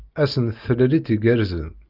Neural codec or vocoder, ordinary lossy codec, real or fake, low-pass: none; Opus, 24 kbps; real; 5.4 kHz